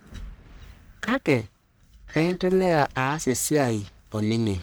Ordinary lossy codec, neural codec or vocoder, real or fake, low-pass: none; codec, 44.1 kHz, 1.7 kbps, Pupu-Codec; fake; none